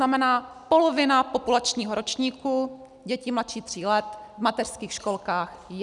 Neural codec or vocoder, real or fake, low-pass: none; real; 10.8 kHz